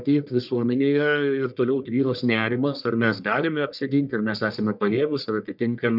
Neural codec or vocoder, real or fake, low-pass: codec, 44.1 kHz, 1.7 kbps, Pupu-Codec; fake; 5.4 kHz